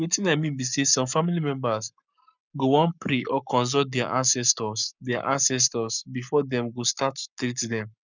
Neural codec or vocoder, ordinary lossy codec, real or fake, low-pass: codec, 44.1 kHz, 7.8 kbps, Pupu-Codec; none; fake; 7.2 kHz